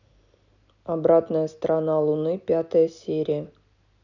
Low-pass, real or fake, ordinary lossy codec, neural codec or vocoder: 7.2 kHz; real; none; none